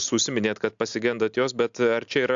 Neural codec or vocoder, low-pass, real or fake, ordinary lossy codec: none; 7.2 kHz; real; AAC, 64 kbps